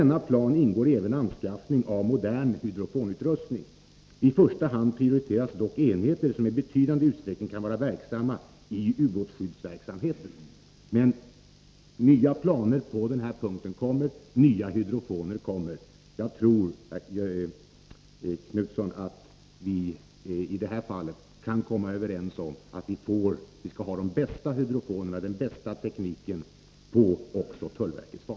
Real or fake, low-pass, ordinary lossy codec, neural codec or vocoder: real; none; none; none